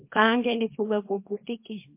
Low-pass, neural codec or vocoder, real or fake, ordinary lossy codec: 3.6 kHz; codec, 24 kHz, 0.9 kbps, WavTokenizer, small release; fake; MP3, 24 kbps